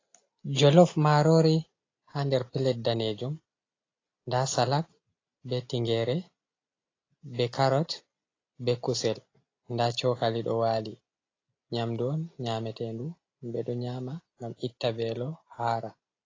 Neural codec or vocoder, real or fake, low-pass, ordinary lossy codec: none; real; 7.2 kHz; AAC, 32 kbps